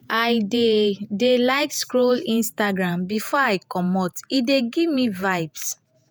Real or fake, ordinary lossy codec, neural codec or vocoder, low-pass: fake; none; vocoder, 48 kHz, 128 mel bands, Vocos; none